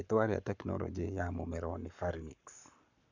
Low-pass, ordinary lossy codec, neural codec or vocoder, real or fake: 7.2 kHz; none; codec, 44.1 kHz, 7.8 kbps, Pupu-Codec; fake